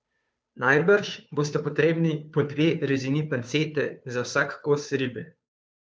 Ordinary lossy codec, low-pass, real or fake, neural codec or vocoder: none; none; fake; codec, 16 kHz, 2 kbps, FunCodec, trained on Chinese and English, 25 frames a second